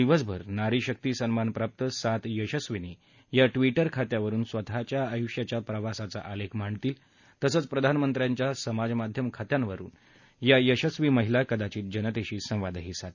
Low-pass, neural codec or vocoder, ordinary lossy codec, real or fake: 7.2 kHz; none; none; real